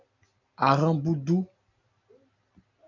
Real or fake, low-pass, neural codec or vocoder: real; 7.2 kHz; none